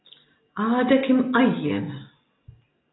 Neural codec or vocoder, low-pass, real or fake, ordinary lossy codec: none; 7.2 kHz; real; AAC, 16 kbps